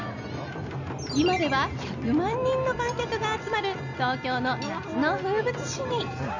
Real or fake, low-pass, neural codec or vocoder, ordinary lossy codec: real; 7.2 kHz; none; none